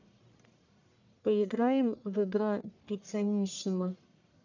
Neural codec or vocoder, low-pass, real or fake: codec, 44.1 kHz, 1.7 kbps, Pupu-Codec; 7.2 kHz; fake